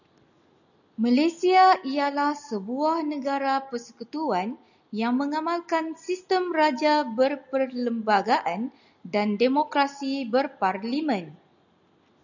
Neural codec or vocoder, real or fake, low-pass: none; real; 7.2 kHz